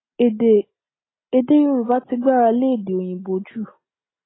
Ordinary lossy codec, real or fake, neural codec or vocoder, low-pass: AAC, 16 kbps; real; none; 7.2 kHz